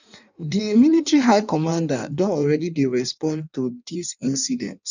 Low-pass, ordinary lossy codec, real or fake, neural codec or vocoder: 7.2 kHz; none; fake; codec, 16 kHz in and 24 kHz out, 1.1 kbps, FireRedTTS-2 codec